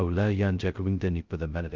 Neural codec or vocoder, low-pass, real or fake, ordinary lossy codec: codec, 16 kHz, 0.2 kbps, FocalCodec; 7.2 kHz; fake; Opus, 24 kbps